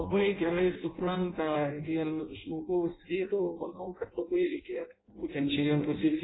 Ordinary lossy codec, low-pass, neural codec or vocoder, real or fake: AAC, 16 kbps; 7.2 kHz; codec, 16 kHz in and 24 kHz out, 0.6 kbps, FireRedTTS-2 codec; fake